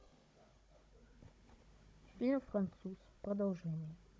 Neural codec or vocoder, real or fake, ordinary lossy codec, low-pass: codec, 16 kHz, 16 kbps, FunCodec, trained on Chinese and English, 50 frames a second; fake; none; none